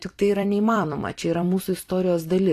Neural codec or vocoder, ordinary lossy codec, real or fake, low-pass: vocoder, 44.1 kHz, 128 mel bands every 256 samples, BigVGAN v2; AAC, 48 kbps; fake; 14.4 kHz